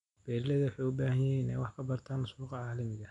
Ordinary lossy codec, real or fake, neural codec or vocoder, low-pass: none; real; none; 10.8 kHz